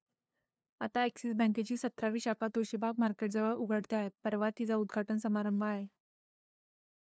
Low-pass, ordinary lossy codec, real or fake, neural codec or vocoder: none; none; fake; codec, 16 kHz, 2 kbps, FunCodec, trained on LibriTTS, 25 frames a second